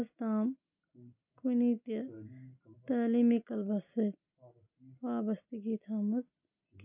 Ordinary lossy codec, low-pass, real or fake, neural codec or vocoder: none; 3.6 kHz; real; none